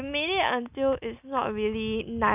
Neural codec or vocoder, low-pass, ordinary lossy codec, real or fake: none; 3.6 kHz; none; real